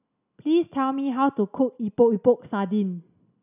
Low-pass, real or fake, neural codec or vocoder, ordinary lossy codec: 3.6 kHz; real; none; none